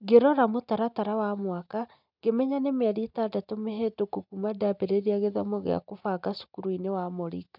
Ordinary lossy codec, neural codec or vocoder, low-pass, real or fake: none; none; 5.4 kHz; real